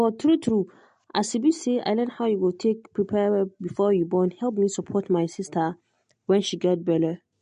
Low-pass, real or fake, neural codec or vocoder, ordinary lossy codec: 9.9 kHz; real; none; MP3, 48 kbps